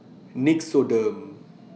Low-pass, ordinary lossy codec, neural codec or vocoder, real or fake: none; none; none; real